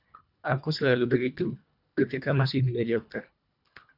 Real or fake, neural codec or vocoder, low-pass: fake; codec, 24 kHz, 1.5 kbps, HILCodec; 5.4 kHz